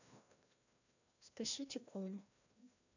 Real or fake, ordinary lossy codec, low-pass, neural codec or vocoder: fake; none; 7.2 kHz; codec, 16 kHz, 1 kbps, FreqCodec, larger model